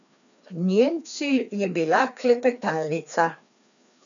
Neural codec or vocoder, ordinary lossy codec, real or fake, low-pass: codec, 16 kHz, 2 kbps, FreqCodec, larger model; none; fake; 7.2 kHz